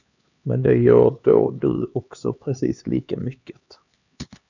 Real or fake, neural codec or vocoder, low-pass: fake; codec, 16 kHz, 2 kbps, X-Codec, HuBERT features, trained on LibriSpeech; 7.2 kHz